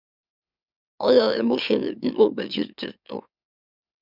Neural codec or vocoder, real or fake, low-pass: autoencoder, 44.1 kHz, a latent of 192 numbers a frame, MeloTTS; fake; 5.4 kHz